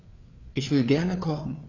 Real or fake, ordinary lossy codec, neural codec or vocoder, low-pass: fake; AAC, 32 kbps; codec, 16 kHz, 4 kbps, FreqCodec, larger model; 7.2 kHz